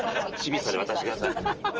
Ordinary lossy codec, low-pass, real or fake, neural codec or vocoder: Opus, 24 kbps; 7.2 kHz; real; none